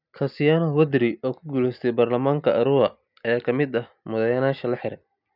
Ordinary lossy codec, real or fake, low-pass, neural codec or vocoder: none; real; 5.4 kHz; none